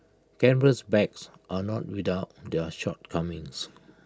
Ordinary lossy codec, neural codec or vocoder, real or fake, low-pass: none; none; real; none